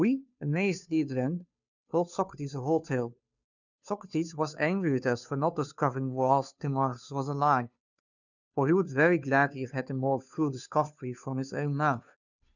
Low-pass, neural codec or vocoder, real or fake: 7.2 kHz; codec, 16 kHz, 2 kbps, FunCodec, trained on Chinese and English, 25 frames a second; fake